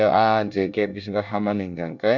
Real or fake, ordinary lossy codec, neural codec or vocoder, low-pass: fake; none; codec, 24 kHz, 1 kbps, SNAC; 7.2 kHz